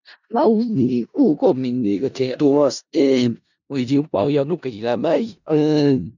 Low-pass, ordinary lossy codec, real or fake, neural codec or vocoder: 7.2 kHz; none; fake; codec, 16 kHz in and 24 kHz out, 0.4 kbps, LongCat-Audio-Codec, four codebook decoder